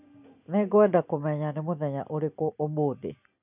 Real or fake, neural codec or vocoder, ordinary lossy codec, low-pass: real; none; none; 3.6 kHz